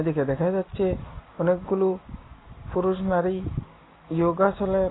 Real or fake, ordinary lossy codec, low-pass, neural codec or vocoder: real; AAC, 16 kbps; 7.2 kHz; none